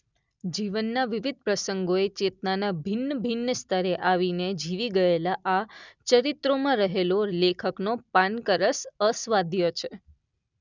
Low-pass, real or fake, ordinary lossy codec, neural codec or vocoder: 7.2 kHz; real; none; none